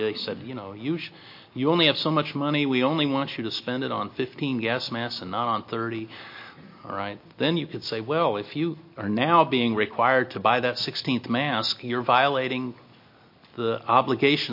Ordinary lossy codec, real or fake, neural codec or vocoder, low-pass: MP3, 32 kbps; real; none; 5.4 kHz